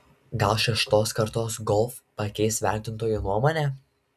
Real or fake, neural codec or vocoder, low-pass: real; none; 14.4 kHz